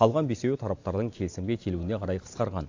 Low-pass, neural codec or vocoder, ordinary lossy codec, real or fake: 7.2 kHz; vocoder, 44.1 kHz, 128 mel bands every 512 samples, BigVGAN v2; AAC, 48 kbps; fake